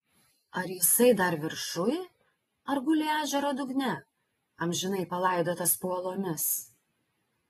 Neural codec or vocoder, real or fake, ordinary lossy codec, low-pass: none; real; AAC, 32 kbps; 19.8 kHz